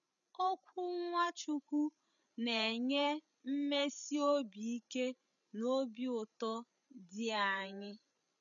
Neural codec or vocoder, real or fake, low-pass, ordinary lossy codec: codec, 16 kHz, 8 kbps, FreqCodec, larger model; fake; 7.2 kHz; none